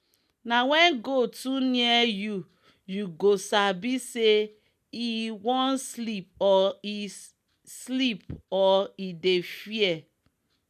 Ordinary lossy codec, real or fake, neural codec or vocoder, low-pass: none; real; none; 14.4 kHz